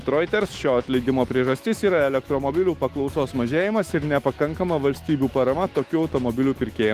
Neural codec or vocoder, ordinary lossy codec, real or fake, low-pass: autoencoder, 48 kHz, 128 numbers a frame, DAC-VAE, trained on Japanese speech; Opus, 24 kbps; fake; 14.4 kHz